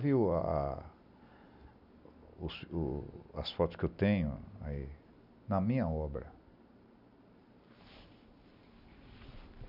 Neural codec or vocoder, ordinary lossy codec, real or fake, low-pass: none; none; real; 5.4 kHz